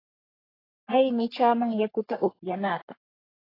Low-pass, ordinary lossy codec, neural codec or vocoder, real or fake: 5.4 kHz; AAC, 24 kbps; codec, 44.1 kHz, 3.4 kbps, Pupu-Codec; fake